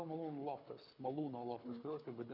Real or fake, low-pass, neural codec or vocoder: fake; 5.4 kHz; codec, 24 kHz, 6 kbps, HILCodec